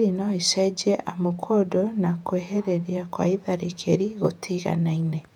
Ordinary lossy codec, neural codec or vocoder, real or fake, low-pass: none; vocoder, 48 kHz, 128 mel bands, Vocos; fake; 19.8 kHz